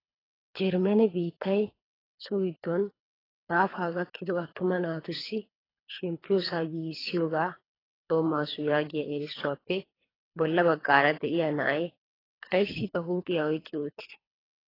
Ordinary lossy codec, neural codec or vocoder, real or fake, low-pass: AAC, 24 kbps; codec, 24 kHz, 3 kbps, HILCodec; fake; 5.4 kHz